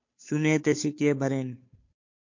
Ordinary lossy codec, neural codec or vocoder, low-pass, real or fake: MP3, 48 kbps; codec, 16 kHz, 2 kbps, FunCodec, trained on Chinese and English, 25 frames a second; 7.2 kHz; fake